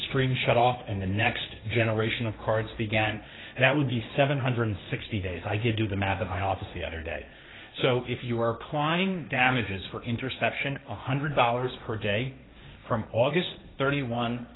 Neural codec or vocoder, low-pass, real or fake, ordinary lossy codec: codec, 16 kHz, 1.1 kbps, Voila-Tokenizer; 7.2 kHz; fake; AAC, 16 kbps